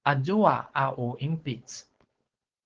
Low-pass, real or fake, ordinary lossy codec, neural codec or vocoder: 7.2 kHz; fake; Opus, 16 kbps; codec, 16 kHz, 4.8 kbps, FACodec